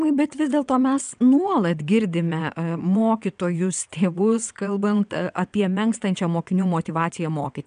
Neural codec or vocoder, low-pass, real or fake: vocoder, 22.05 kHz, 80 mel bands, WaveNeXt; 9.9 kHz; fake